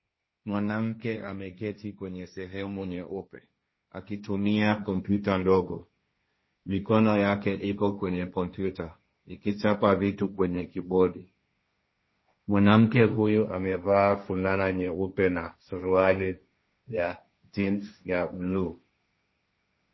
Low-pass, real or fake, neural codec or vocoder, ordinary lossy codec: 7.2 kHz; fake; codec, 16 kHz, 1.1 kbps, Voila-Tokenizer; MP3, 24 kbps